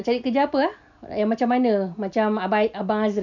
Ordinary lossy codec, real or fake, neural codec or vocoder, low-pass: none; real; none; 7.2 kHz